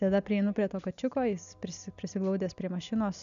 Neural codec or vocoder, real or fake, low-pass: none; real; 7.2 kHz